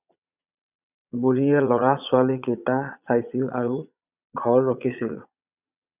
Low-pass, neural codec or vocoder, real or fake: 3.6 kHz; vocoder, 22.05 kHz, 80 mel bands, Vocos; fake